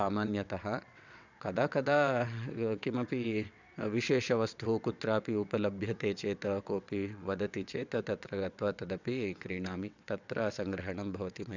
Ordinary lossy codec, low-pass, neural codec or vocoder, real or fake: none; 7.2 kHz; vocoder, 22.05 kHz, 80 mel bands, WaveNeXt; fake